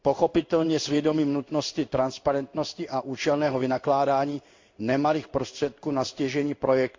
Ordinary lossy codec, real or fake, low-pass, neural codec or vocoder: MP3, 48 kbps; fake; 7.2 kHz; codec, 16 kHz in and 24 kHz out, 1 kbps, XY-Tokenizer